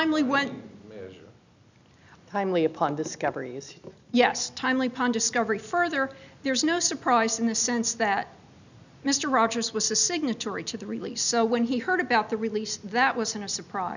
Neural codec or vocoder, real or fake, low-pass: none; real; 7.2 kHz